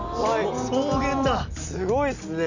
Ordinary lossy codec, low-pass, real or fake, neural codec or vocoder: none; 7.2 kHz; real; none